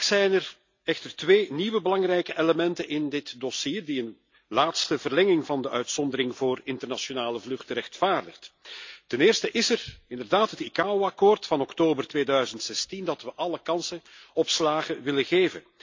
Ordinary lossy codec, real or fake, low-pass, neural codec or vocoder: MP3, 64 kbps; real; 7.2 kHz; none